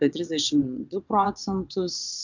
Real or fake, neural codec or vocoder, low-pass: fake; vocoder, 22.05 kHz, 80 mel bands, WaveNeXt; 7.2 kHz